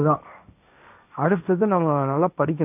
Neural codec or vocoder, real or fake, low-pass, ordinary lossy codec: codec, 16 kHz, 1.1 kbps, Voila-Tokenizer; fake; 3.6 kHz; none